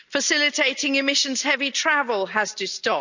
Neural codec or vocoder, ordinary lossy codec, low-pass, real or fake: none; none; 7.2 kHz; real